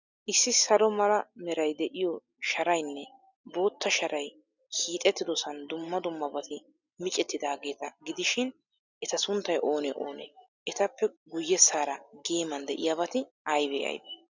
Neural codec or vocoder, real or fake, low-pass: none; real; 7.2 kHz